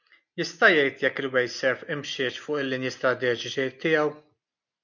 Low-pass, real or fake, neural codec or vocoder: 7.2 kHz; real; none